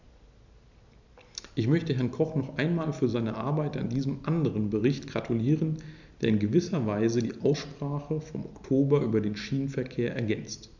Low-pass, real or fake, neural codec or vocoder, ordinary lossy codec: 7.2 kHz; real; none; none